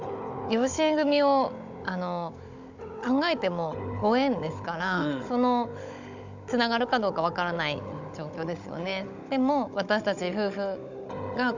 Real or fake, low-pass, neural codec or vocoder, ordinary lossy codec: fake; 7.2 kHz; codec, 16 kHz, 16 kbps, FunCodec, trained on Chinese and English, 50 frames a second; none